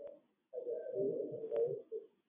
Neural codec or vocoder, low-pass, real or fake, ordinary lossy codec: none; 3.6 kHz; real; AAC, 16 kbps